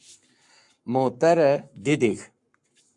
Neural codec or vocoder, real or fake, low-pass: codec, 44.1 kHz, 7.8 kbps, Pupu-Codec; fake; 10.8 kHz